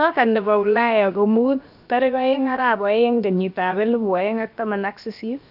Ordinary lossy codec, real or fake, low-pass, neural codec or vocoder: none; fake; 5.4 kHz; codec, 16 kHz, about 1 kbps, DyCAST, with the encoder's durations